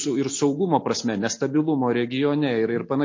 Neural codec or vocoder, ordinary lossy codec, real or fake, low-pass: none; MP3, 32 kbps; real; 7.2 kHz